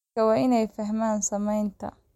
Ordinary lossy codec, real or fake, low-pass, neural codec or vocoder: MP3, 64 kbps; real; 19.8 kHz; none